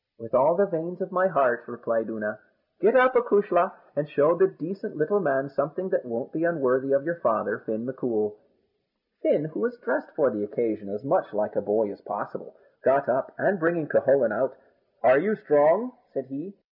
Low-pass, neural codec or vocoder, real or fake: 5.4 kHz; none; real